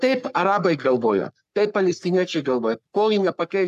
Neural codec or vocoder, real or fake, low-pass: codec, 44.1 kHz, 3.4 kbps, Pupu-Codec; fake; 14.4 kHz